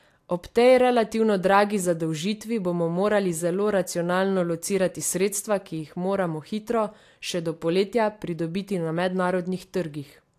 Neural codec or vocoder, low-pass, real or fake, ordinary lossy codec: none; 14.4 kHz; real; AAC, 64 kbps